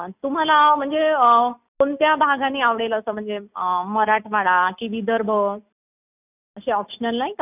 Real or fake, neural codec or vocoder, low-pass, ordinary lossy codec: real; none; 3.6 kHz; none